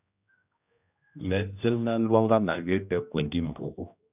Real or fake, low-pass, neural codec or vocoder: fake; 3.6 kHz; codec, 16 kHz, 1 kbps, X-Codec, HuBERT features, trained on general audio